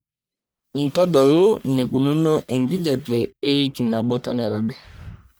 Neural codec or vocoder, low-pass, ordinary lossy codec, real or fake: codec, 44.1 kHz, 1.7 kbps, Pupu-Codec; none; none; fake